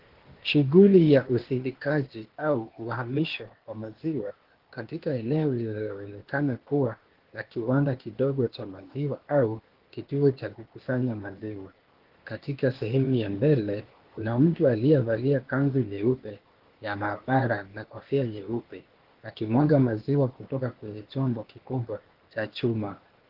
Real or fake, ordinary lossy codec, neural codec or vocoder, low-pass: fake; Opus, 16 kbps; codec, 16 kHz, 0.8 kbps, ZipCodec; 5.4 kHz